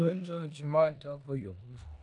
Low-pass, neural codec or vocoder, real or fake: 10.8 kHz; codec, 16 kHz in and 24 kHz out, 0.9 kbps, LongCat-Audio-Codec, four codebook decoder; fake